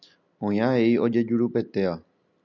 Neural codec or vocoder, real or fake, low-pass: none; real; 7.2 kHz